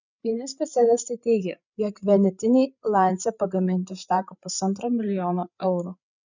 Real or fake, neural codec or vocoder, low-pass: fake; codec, 16 kHz, 8 kbps, FreqCodec, larger model; 7.2 kHz